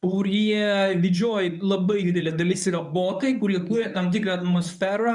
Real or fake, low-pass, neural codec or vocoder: fake; 10.8 kHz; codec, 24 kHz, 0.9 kbps, WavTokenizer, medium speech release version 1